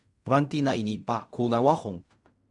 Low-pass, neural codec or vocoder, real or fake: 10.8 kHz; codec, 16 kHz in and 24 kHz out, 0.4 kbps, LongCat-Audio-Codec, fine tuned four codebook decoder; fake